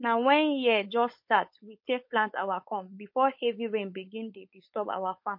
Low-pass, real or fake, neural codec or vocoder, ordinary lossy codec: 5.4 kHz; fake; codec, 16 kHz, 4.8 kbps, FACodec; MP3, 32 kbps